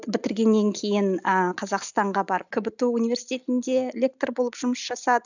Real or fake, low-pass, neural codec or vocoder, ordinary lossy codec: real; 7.2 kHz; none; none